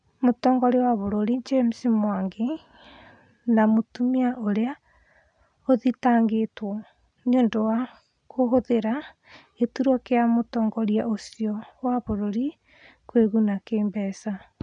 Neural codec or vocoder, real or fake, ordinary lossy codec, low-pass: none; real; none; 9.9 kHz